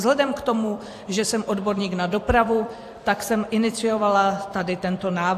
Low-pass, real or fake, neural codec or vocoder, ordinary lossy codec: 14.4 kHz; fake; vocoder, 44.1 kHz, 128 mel bands every 256 samples, BigVGAN v2; AAC, 64 kbps